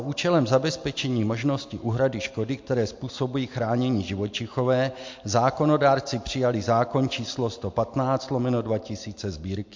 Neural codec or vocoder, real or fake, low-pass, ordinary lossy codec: none; real; 7.2 kHz; MP3, 48 kbps